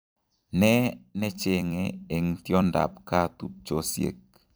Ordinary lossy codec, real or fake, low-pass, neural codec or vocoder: none; fake; none; vocoder, 44.1 kHz, 128 mel bands every 256 samples, BigVGAN v2